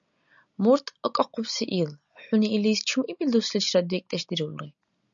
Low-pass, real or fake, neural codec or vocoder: 7.2 kHz; real; none